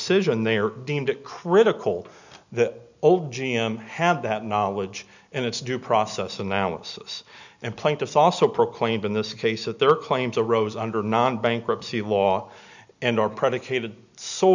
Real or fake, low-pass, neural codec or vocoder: real; 7.2 kHz; none